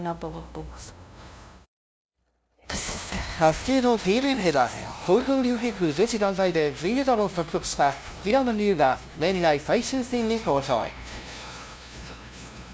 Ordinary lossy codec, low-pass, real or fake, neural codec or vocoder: none; none; fake; codec, 16 kHz, 0.5 kbps, FunCodec, trained on LibriTTS, 25 frames a second